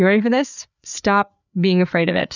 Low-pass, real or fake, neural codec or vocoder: 7.2 kHz; fake; codec, 16 kHz, 4 kbps, FreqCodec, larger model